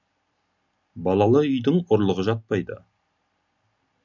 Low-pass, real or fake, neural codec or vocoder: 7.2 kHz; real; none